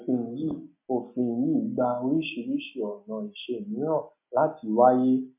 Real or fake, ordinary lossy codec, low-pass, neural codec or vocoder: real; MP3, 24 kbps; 3.6 kHz; none